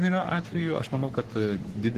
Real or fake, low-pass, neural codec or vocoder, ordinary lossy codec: fake; 14.4 kHz; codec, 44.1 kHz, 3.4 kbps, Pupu-Codec; Opus, 16 kbps